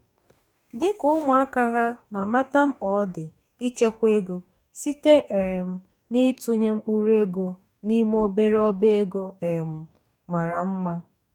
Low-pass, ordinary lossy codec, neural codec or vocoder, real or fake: 19.8 kHz; none; codec, 44.1 kHz, 2.6 kbps, DAC; fake